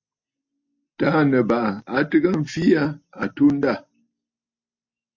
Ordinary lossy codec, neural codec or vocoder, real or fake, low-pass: MP3, 48 kbps; none; real; 7.2 kHz